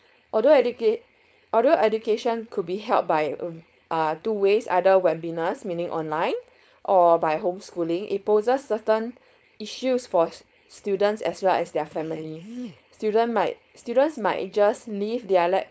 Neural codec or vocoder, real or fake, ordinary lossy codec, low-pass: codec, 16 kHz, 4.8 kbps, FACodec; fake; none; none